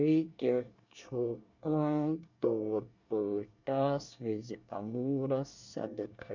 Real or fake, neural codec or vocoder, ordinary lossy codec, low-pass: fake; codec, 24 kHz, 1 kbps, SNAC; none; 7.2 kHz